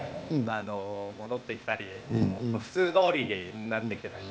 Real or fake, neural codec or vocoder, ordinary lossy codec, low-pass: fake; codec, 16 kHz, 0.8 kbps, ZipCodec; none; none